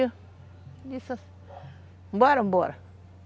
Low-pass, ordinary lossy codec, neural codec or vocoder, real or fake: none; none; none; real